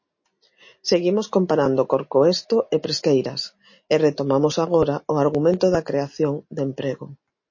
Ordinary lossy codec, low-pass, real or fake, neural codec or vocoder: MP3, 32 kbps; 7.2 kHz; real; none